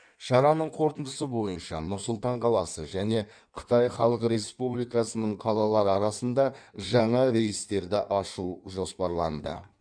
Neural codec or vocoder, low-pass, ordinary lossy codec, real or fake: codec, 16 kHz in and 24 kHz out, 1.1 kbps, FireRedTTS-2 codec; 9.9 kHz; none; fake